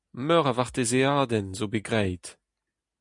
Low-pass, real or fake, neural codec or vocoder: 10.8 kHz; real; none